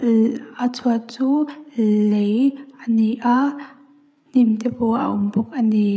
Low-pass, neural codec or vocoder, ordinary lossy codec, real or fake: none; codec, 16 kHz, 8 kbps, FreqCodec, larger model; none; fake